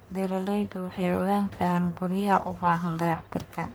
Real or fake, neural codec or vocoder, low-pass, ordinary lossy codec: fake; codec, 44.1 kHz, 1.7 kbps, Pupu-Codec; none; none